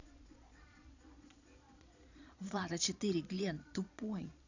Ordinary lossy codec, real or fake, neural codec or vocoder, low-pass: none; fake; vocoder, 22.05 kHz, 80 mel bands, Vocos; 7.2 kHz